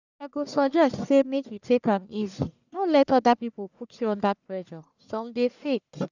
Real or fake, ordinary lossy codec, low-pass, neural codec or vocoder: fake; none; 7.2 kHz; codec, 44.1 kHz, 1.7 kbps, Pupu-Codec